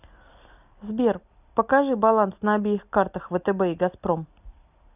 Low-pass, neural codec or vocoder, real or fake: 3.6 kHz; none; real